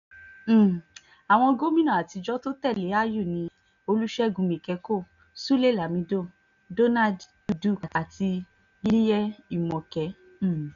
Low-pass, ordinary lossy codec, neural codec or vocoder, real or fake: 7.2 kHz; none; none; real